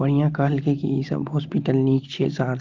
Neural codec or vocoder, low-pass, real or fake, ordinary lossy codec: none; 7.2 kHz; real; Opus, 32 kbps